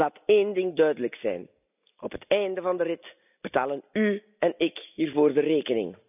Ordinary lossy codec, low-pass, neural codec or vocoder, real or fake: none; 3.6 kHz; none; real